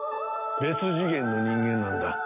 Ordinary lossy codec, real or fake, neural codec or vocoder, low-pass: none; real; none; 3.6 kHz